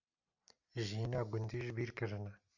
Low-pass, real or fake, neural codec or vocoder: 7.2 kHz; real; none